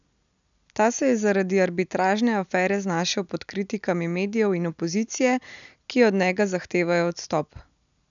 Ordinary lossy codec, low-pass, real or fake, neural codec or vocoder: none; 7.2 kHz; real; none